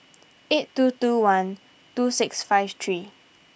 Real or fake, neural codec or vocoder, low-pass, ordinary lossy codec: real; none; none; none